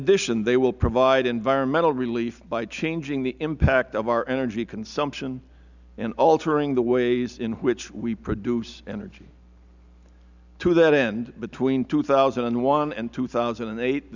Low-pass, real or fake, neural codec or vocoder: 7.2 kHz; real; none